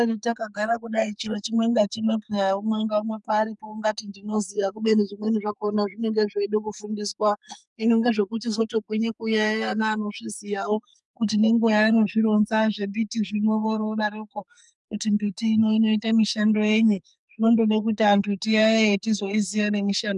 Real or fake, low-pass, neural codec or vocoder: fake; 10.8 kHz; codec, 44.1 kHz, 2.6 kbps, SNAC